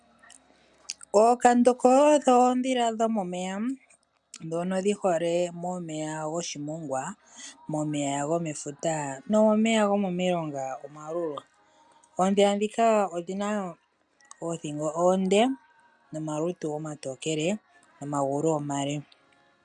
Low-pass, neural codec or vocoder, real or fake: 10.8 kHz; none; real